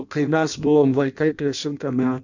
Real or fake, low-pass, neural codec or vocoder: fake; 7.2 kHz; codec, 16 kHz in and 24 kHz out, 0.6 kbps, FireRedTTS-2 codec